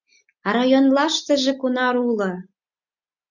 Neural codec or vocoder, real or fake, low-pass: none; real; 7.2 kHz